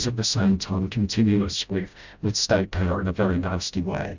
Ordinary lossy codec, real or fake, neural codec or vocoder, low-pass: Opus, 64 kbps; fake; codec, 16 kHz, 0.5 kbps, FreqCodec, smaller model; 7.2 kHz